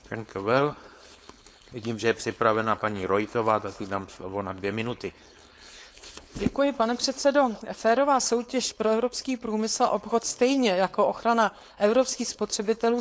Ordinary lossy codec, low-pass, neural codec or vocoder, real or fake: none; none; codec, 16 kHz, 4.8 kbps, FACodec; fake